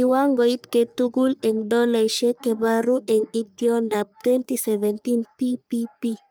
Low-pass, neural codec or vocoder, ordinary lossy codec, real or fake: none; codec, 44.1 kHz, 3.4 kbps, Pupu-Codec; none; fake